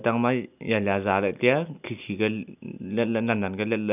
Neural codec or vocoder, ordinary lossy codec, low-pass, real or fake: none; none; 3.6 kHz; real